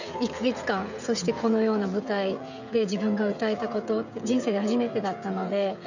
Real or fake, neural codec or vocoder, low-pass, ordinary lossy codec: fake; codec, 16 kHz, 16 kbps, FreqCodec, smaller model; 7.2 kHz; none